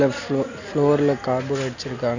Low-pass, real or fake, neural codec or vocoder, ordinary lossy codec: 7.2 kHz; real; none; none